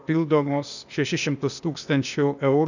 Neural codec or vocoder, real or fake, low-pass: codec, 16 kHz, 0.8 kbps, ZipCodec; fake; 7.2 kHz